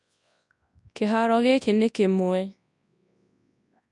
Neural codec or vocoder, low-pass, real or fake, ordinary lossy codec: codec, 24 kHz, 0.9 kbps, WavTokenizer, large speech release; 10.8 kHz; fake; none